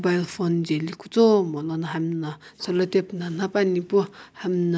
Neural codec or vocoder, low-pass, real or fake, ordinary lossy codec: none; none; real; none